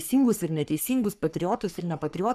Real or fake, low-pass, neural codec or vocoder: fake; 14.4 kHz; codec, 44.1 kHz, 3.4 kbps, Pupu-Codec